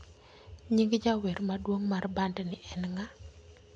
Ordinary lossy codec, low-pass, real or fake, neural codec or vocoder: none; 9.9 kHz; real; none